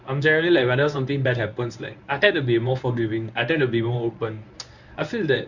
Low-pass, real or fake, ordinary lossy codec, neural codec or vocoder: 7.2 kHz; fake; none; codec, 24 kHz, 0.9 kbps, WavTokenizer, medium speech release version 1